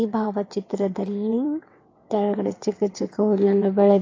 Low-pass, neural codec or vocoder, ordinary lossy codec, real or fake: 7.2 kHz; vocoder, 22.05 kHz, 80 mel bands, WaveNeXt; AAC, 32 kbps; fake